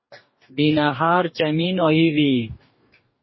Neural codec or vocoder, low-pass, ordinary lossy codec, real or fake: codec, 44.1 kHz, 2.6 kbps, DAC; 7.2 kHz; MP3, 24 kbps; fake